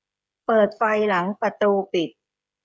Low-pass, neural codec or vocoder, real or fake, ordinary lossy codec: none; codec, 16 kHz, 16 kbps, FreqCodec, smaller model; fake; none